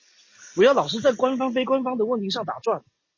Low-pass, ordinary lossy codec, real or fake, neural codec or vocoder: 7.2 kHz; MP3, 32 kbps; real; none